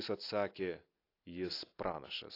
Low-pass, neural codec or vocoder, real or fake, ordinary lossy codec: 5.4 kHz; none; real; AAC, 32 kbps